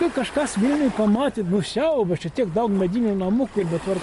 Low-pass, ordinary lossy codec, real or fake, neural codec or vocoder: 14.4 kHz; MP3, 48 kbps; real; none